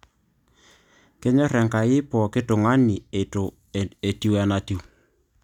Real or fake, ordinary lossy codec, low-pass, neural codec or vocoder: real; none; 19.8 kHz; none